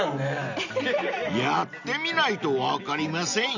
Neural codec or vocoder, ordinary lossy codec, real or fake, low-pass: none; none; real; 7.2 kHz